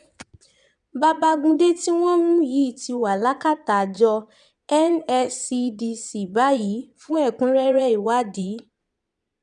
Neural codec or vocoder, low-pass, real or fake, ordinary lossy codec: vocoder, 22.05 kHz, 80 mel bands, Vocos; 9.9 kHz; fake; none